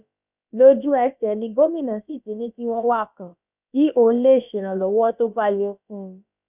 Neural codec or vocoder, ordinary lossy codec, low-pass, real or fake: codec, 16 kHz, about 1 kbps, DyCAST, with the encoder's durations; none; 3.6 kHz; fake